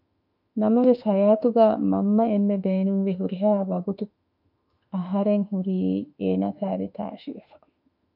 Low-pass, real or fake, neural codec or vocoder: 5.4 kHz; fake; autoencoder, 48 kHz, 32 numbers a frame, DAC-VAE, trained on Japanese speech